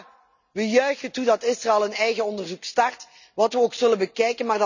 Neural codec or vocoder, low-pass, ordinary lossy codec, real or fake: none; 7.2 kHz; none; real